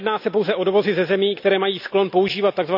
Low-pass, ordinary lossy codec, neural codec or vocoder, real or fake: 5.4 kHz; none; none; real